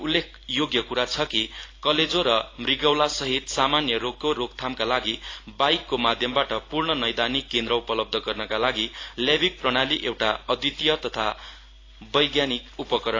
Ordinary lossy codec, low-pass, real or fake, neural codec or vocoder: AAC, 32 kbps; 7.2 kHz; real; none